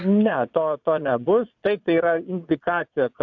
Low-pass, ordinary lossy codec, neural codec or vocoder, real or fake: 7.2 kHz; AAC, 48 kbps; vocoder, 22.05 kHz, 80 mel bands, Vocos; fake